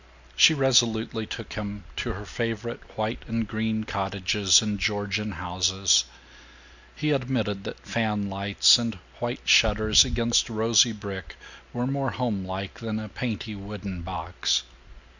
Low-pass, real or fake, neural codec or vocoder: 7.2 kHz; real; none